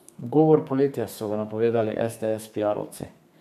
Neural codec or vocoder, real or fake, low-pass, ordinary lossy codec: codec, 32 kHz, 1.9 kbps, SNAC; fake; 14.4 kHz; none